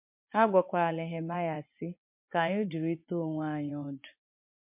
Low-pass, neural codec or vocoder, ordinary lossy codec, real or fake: 3.6 kHz; vocoder, 24 kHz, 100 mel bands, Vocos; MP3, 32 kbps; fake